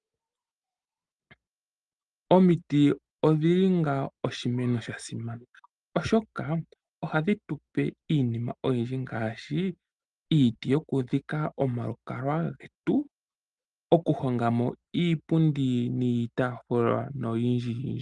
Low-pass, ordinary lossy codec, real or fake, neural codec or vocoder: 10.8 kHz; Opus, 32 kbps; real; none